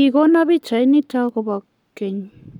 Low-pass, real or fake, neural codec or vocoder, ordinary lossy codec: 19.8 kHz; fake; codec, 44.1 kHz, 7.8 kbps, Pupu-Codec; none